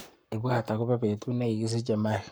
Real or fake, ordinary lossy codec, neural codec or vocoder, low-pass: fake; none; codec, 44.1 kHz, 7.8 kbps, Pupu-Codec; none